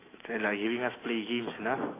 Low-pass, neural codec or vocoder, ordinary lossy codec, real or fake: 3.6 kHz; autoencoder, 48 kHz, 128 numbers a frame, DAC-VAE, trained on Japanese speech; AAC, 16 kbps; fake